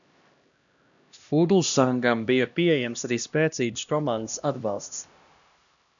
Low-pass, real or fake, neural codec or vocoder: 7.2 kHz; fake; codec, 16 kHz, 1 kbps, X-Codec, HuBERT features, trained on LibriSpeech